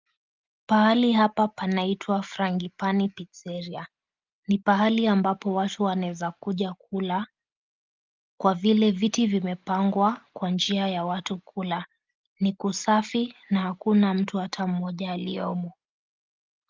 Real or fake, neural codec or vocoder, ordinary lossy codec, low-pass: real; none; Opus, 24 kbps; 7.2 kHz